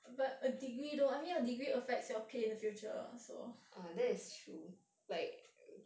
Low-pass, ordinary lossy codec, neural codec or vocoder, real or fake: none; none; none; real